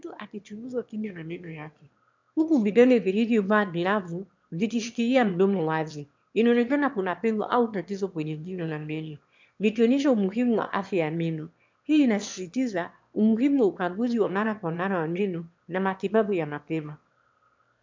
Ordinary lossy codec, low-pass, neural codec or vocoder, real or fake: MP3, 64 kbps; 7.2 kHz; autoencoder, 22.05 kHz, a latent of 192 numbers a frame, VITS, trained on one speaker; fake